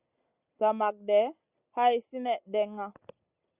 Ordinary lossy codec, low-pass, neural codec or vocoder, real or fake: Opus, 64 kbps; 3.6 kHz; none; real